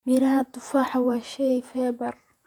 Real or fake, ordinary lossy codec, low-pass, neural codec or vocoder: fake; none; 19.8 kHz; vocoder, 48 kHz, 128 mel bands, Vocos